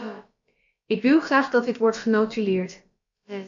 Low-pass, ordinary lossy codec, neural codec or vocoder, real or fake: 7.2 kHz; MP3, 48 kbps; codec, 16 kHz, about 1 kbps, DyCAST, with the encoder's durations; fake